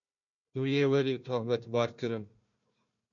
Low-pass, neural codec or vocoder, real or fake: 7.2 kHz; codec, 16 kHz, 1 kbps, FunCodec, trained on Chinese and English, 50 frames a second; fake